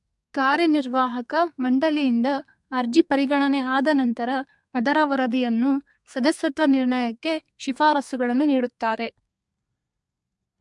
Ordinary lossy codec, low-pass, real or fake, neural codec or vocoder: MP3, 64 kbps; 10.8 kHz; fake; codec, 32 kHz, 1.9 kbps, SNAC